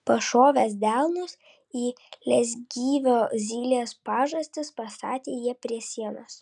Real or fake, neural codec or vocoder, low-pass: real; none; 10.8 kHz